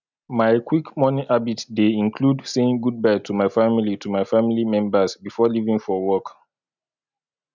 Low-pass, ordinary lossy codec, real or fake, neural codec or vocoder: 7.2 kHz; none; real; none